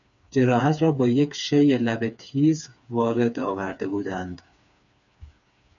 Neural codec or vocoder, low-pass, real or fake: codec, 16 kHz, 4 kbps, FreqCodec, smaller model; 7.2 kHz; fake